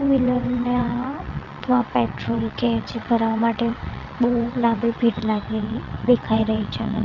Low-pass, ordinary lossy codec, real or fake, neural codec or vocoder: 7.2 kHz; none; fake; vocoder, 22.05 kHz, 80 mel bands, WaveNeXt